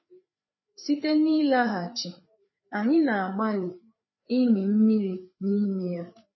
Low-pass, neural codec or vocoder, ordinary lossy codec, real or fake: 7.2 kHz; codec, 16 kHz, 4 kbps, FreqCodec, larger model; MP3, 24 kbps; fake